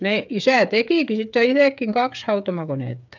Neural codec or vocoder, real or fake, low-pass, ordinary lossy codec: codec, 16 kHz, 8 kbps, FreqCodec, smaller model; fake; 7.2 kHz; none